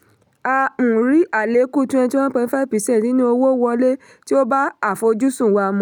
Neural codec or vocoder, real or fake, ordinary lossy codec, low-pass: none; real; none; 19.8 kHz